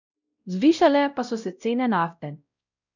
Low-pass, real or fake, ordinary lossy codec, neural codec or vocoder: 7.2 kHz; fake; none; codec, 16 kHz, 0.5 kbps, X-Codec, WavLM features, trained on Multilingual LibriSpeech